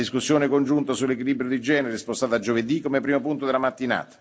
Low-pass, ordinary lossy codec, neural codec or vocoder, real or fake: none; none; none; real